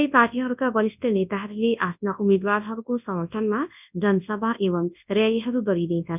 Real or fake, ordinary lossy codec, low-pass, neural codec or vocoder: fake; none; 3.6 kHz; codec, 24 kHz, 0.9 kbps, WavTokenizer, large speech release